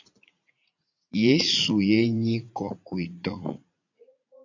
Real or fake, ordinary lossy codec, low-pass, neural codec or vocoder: fake; AAC, 48 kbps; 7.2 kHz; vocoder, 44.1 kHz, 80 mel bands, Vocos